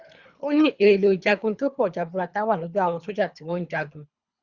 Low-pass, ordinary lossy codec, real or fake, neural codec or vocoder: 7.2 kHz; Opus, 64 kbps; fake; codec, 24 kHz, 3 kbps, HILCodec